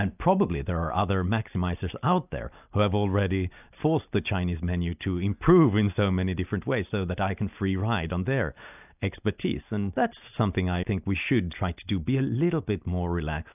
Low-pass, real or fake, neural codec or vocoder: 3.6 kHz; real; none